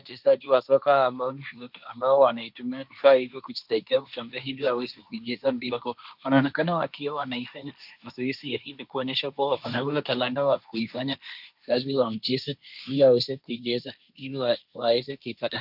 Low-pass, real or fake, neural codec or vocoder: 5.4 kHz; fake; codec, 16 kHz, 1.1 kbps, Voila-Tokenizer